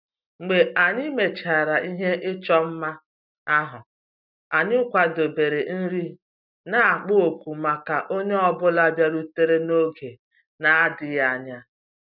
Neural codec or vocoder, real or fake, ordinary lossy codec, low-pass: none; real; none; 5.4 kHz